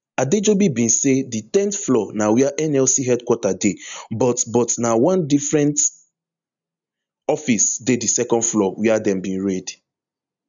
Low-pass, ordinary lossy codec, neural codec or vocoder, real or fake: 7.2 kHz; none; none; real